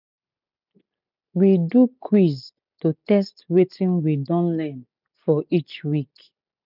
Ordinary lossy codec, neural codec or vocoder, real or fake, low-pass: none; none; real; 5.4 kHz